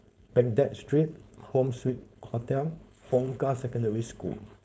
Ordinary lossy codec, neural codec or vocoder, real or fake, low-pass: none; codec, 16 kHz, 4.8 kbps, FACodec; fake; none